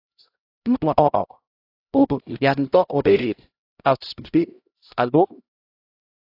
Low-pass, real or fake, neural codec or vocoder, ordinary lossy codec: 5.4 kHz; fake; codec, 16 kHz, 0.5 kbps, X-Codec, HuBERT features, trained on LibriSpeech; AAC, 32 kbps